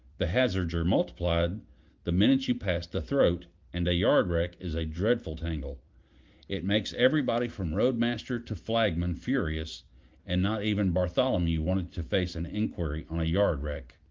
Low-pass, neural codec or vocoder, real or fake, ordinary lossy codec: 7.2 kHz; none; real; Opus, 32 kbps